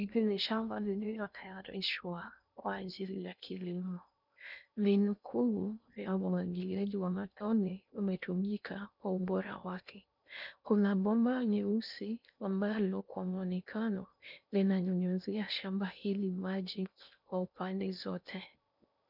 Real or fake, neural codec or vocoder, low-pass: fake; codec, 16 kHz in and 24 kHz out, 0.6 kbps, FocalCodec, streaming, 2048 codes; 5.4 kHz